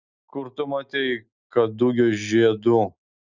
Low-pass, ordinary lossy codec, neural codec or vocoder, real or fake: 7.2 kHz; Opus, 64 kbps; none; real